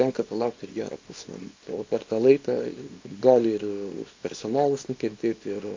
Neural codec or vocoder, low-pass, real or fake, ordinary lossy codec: codec, 24 kHz, 0.9 kbps, WavTokenizer, small release; 7.2 kHz; fake; MP3, 48 kbps